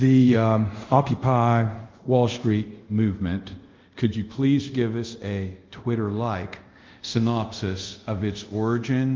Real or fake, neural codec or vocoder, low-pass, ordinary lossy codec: fake; codec, 24 kHz, 0.5 kbps, DualCodec; 7.2 kHz; Opus, 32 kbps